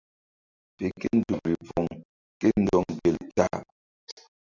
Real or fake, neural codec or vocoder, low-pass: real; none; 7.2 kHz